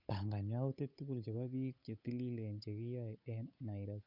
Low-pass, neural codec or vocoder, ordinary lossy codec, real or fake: 5.4 kHz; codec, 16 kHz, 8 kbps, FunCodec, trained on Chinese and English, 25 frames a second; none; fake